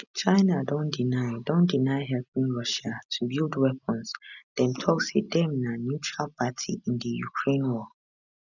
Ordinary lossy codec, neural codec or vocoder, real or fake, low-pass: none; none; real; 7.2 kHz